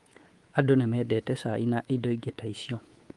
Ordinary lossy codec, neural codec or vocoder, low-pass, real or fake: Opus, 24 kbps; codec, 24 kHz, 3.1 kbps, DualCodec; 10.8 kHz; fake